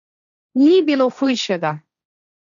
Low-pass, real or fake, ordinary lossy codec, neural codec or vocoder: 7.2 kHz; fake; none; codec, 16 kHz, 1.1 kbps, Voila-Tokenizer